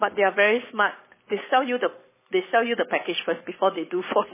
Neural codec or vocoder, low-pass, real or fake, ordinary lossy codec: codec, 44.1 kHz, 7.8 kbps, Pupu-Codec; 3.6 kHz; fake; MP3, 16 kbps